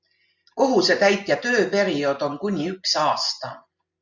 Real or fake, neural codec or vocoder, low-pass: real; none; 7.2 kHz